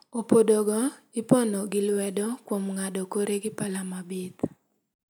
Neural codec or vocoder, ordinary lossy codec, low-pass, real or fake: none; none; none; real